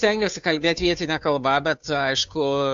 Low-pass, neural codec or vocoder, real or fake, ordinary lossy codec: 7.2 kHz; codec, 16 kHz, 2 kbps, FunCodec, trained on Chinese and English, 25 frames a second; fake; AAC, 64 kbps